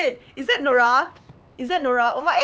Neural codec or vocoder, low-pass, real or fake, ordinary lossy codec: codec, 16 kHz, 4 kbps, X-Codec, HuBERT features, trained on LibriSpeech; none; fake; none